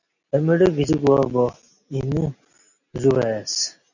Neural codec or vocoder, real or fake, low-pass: none; real; 7.2 kHz